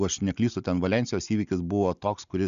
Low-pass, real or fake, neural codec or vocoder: 7.2 kHz; real; none